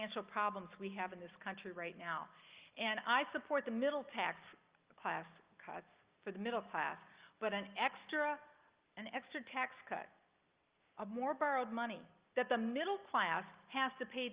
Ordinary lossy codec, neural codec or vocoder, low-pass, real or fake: Opus, 32 kbps; none; 3.6 kHz; real